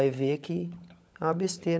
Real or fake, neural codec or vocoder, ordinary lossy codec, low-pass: fake; codec, 16 kHz, 16 kbps, FunCodec, trained on LibriTTS, 50 frames a second; none; none